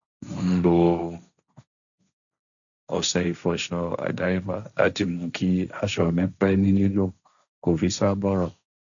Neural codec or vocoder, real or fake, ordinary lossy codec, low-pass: codec, 16 kHz, 1.1 kbps, Voila-Tokenizer; fake; none; 7.2 kHz